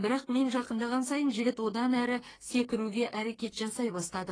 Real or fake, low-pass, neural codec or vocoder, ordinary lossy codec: fake; 9.9 kHz; codec, 44.1 kHz, 2.6 kbps, SNAC; AAC, 32 kbps